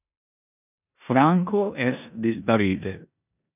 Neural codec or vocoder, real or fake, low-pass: codec, 16 kHz in and 24 kHz out, 0.9 kbps, LongCat-Audio-Codec, four codebook decoder; fake; 3.6 kHz